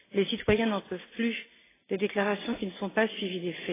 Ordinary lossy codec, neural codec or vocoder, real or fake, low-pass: AAC, 16 kbps; none; real; 3.6 kHz